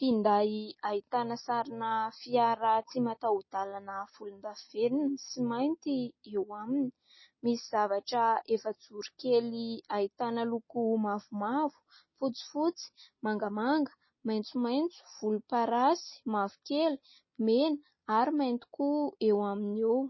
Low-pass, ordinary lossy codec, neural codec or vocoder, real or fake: 7.2 kHz; MP3, 24 kbps; none; real